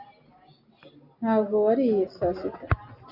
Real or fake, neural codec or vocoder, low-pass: real; none; 5.4 kHz